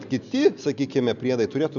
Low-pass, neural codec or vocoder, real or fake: 7.2 kHz; none; real